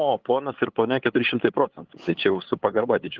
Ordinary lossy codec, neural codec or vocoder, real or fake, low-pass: Opus, 16 kbps; codec, 16 kHz, 8 kbps, FunCodec, trained on LibriTTS, 25 frames a second; fake; 7.2 kHz